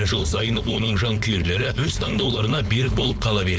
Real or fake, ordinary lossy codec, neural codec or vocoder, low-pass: fake; none; codec, 16 kHz, 4.8 kbps, FACodec; none